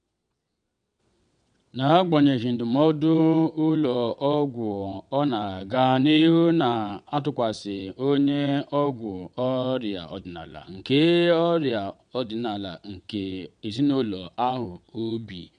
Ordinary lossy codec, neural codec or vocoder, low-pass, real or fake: none; vocoder, 22.05 kHz, 80 mel bands, WaveNeXt; 9.9 kHz; fake